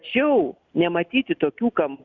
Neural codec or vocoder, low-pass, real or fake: none; 7.2 kHz; real